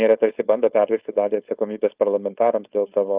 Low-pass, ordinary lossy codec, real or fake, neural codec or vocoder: 3.6 kHz; Opus, 32 kbps; fake; codec, 16 kHz, 4.8 kbps, FACodec